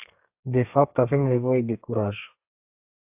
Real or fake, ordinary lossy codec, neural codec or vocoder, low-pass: fake; AAC, 32 kbps; codec, 32 kHz, 1.9 kbps, SNAC; 3.6 kHz